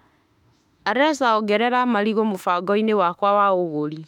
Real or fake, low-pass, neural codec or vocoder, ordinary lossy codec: fake; 19.8 kHz; autoencoder, 48 kHz, 32 numbers a frame, DAC-VAE, trained on Japanese speech; MP3, 96 kbps